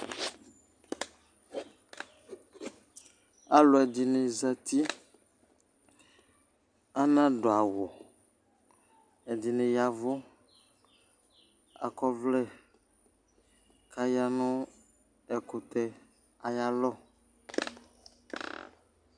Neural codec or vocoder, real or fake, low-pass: none; real; 9.9 kHz